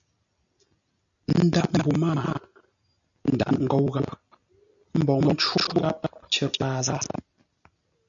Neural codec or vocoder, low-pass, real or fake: none; 7.2 kHz; real